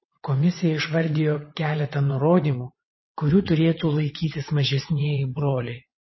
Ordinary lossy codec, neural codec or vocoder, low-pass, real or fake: MP3, 24 kbps; vocoder, 22.05 kHz, 80 mel bands, WaveNeXt; 7.2 kHz; fake